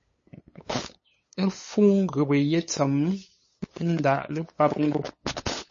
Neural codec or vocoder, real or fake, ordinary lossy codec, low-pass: codec, 16 kHz, 8 kbps, FunCodec, trained on LibriTTS, 25 frames a second; fake; MP3, 32 kbps; 7.2 kHz